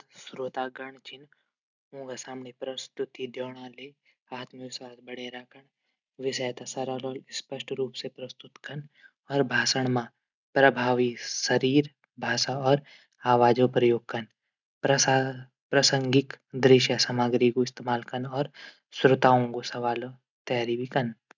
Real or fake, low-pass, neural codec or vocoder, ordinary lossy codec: real; 7.2 kHz; none; none